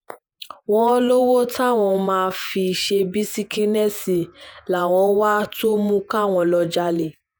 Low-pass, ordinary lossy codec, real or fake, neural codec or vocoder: none; none; fake; vocoder, 48 kHz, 128 mel bands, Vocos